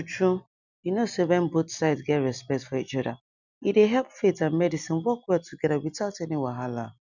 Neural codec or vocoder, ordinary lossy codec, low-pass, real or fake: none; none; 7.2 kHz; real